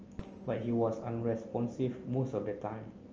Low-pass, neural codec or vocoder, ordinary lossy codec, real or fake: 7.2 kHz; none; Opus, 24 kbps; real